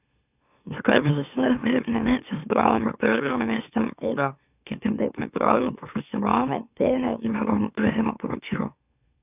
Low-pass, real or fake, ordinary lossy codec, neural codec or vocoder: 3.6 kHz; fake; none; autoencoder, 44.1 kHz, a latent of 192 numbers a frame, MeloTTS